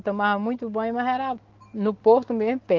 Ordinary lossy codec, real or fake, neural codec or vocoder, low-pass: Opus, 16 kbps; real; none; 7.2 kHz